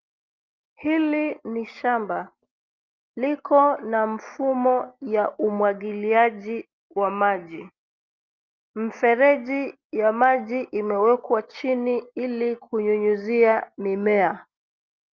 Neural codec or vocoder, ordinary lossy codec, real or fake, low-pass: none; Opus, 32 kbps; real; 7.2 kHz